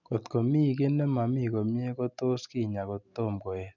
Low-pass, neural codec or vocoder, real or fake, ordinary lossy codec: 7.2 kHz; none; real; none